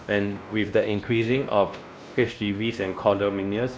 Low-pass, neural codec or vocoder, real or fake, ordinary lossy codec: none; codec, 16 kHz, 1 kbps, X-Codec, WavLM features, trained on Multilingual LibriSpeech; fake; none